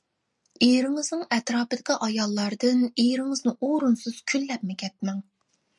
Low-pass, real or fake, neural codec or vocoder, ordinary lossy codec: 10.8 kHz; real; none; MP3, 96 kbps